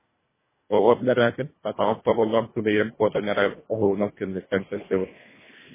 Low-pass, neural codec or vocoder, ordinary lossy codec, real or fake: 3.6 kHz; codec, 24 kHz, 1.5 kbps, HILCodec; MP3, 16 kbps; fake